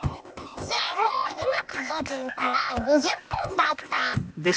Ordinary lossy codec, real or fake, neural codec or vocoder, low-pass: none; fake; codec, 16 kHz, 0.8 kbps, ZipCodec; none